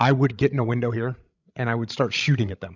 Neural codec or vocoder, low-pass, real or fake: codec, 16 kHz, 16 kbps, FreqCodec, larger model; 7.2 kHz; fake